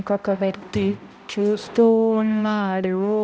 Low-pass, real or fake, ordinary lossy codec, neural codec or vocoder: none; fake; none; codec, 16 kHz, 0.5 kbps, X-Codec, HuBERT features, trained on balanced general audio